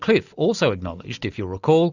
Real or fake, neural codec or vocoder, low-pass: real; none; 7.2 kHz